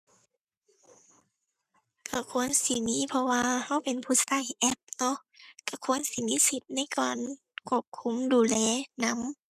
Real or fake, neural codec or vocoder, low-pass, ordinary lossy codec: fake; codec, 44.1 kHz, 7.8 kbps, Pupu-Codec; 14.4 kHz; none